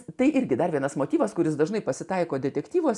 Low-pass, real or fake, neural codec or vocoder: 10.8 kHz; real; none